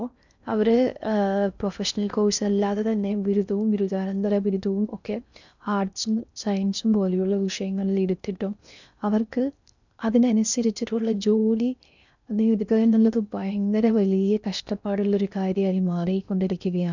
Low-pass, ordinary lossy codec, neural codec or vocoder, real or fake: 7.2 kHz; none; codec, 16 kHz in and 24 kHz out, 0.6 kbps, FocalCodec, streaming, 4096 codes; fake